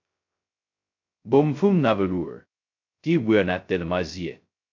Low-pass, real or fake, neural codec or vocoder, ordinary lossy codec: 7.2 kHz; fake; codec, 16 kHz, 0.2 kbps, FocalCodec; MP3, 48 kbps